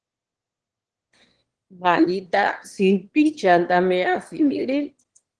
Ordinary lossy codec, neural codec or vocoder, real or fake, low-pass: Opus, 16 kbps; autoencoder, 22.05 kHz, a latent of 192 numbers a frame, VITS, trained on one speaker; fake; 9.9 kHz